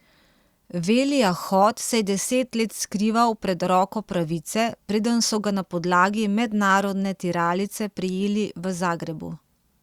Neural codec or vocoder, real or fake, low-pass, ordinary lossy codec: none; real; 19.8 kHz; Opus, 64 kbps